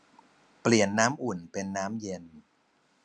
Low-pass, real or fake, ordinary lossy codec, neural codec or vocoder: none; real; none; none